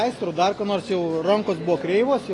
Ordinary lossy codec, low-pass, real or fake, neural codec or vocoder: AAC, 32 kbps; 10.8 kHz; real; none